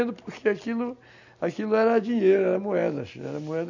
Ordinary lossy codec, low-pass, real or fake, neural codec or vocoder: none; 7.2 kHz; real; none